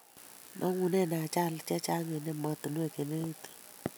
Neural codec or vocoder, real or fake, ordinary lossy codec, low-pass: none; real; none; none